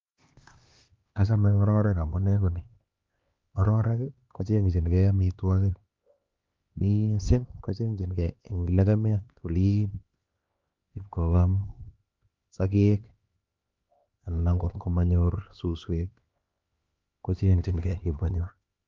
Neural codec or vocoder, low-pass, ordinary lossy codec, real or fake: codec, 16 kHz, 2 kbps, X-Codec, HuBERT features, trained on LibriSpeech; 7.2 kHz; Opus, 32 kbps; fake